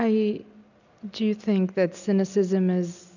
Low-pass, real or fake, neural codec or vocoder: 7.2 kHz; real; none